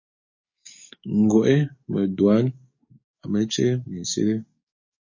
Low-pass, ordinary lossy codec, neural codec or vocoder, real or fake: 7.2 kHz; MP3, 32 kbps; codec, 44.1 kHz, 7.8 kbps, DAC; fake